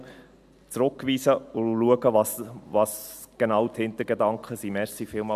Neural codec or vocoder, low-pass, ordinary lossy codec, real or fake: none; 14.4 kHz; AAC, 96 kbps; real